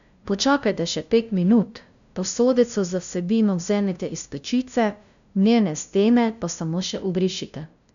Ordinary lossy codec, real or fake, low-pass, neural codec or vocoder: none; fake; 7.2 kHz; codec, 16 kHz, 0.5 kbps, FunCodec, trained on LibriTTS, 25 frames a second